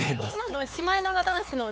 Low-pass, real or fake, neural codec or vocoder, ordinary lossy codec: none; fake; codec, 16 kHz, 4 kbps, X-Codec, HuBERT features, trained on LibriSpeech; none